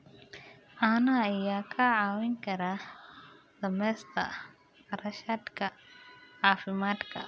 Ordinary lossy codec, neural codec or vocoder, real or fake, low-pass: none; none; real; none